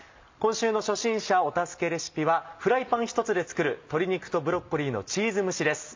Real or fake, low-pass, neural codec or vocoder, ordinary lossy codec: real; 7.2 kHz; none; MP3, 64 kbps